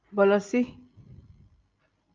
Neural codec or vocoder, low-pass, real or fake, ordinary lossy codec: none; 7.2 kHz; real; Opus, 24 kbps